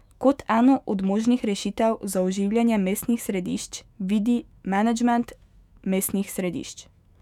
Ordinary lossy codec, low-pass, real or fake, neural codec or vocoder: none; 19.8 kHz; fake; autoencoder, 48 kHz, 128 numbers a frame, DAC-VAE, trained on Japanese speech